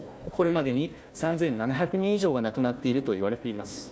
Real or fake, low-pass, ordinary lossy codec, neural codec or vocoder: fake; none; none; codec, 16 kHz, 1 kbps, FunCodec, trained on Chinese and English, 50 frames a second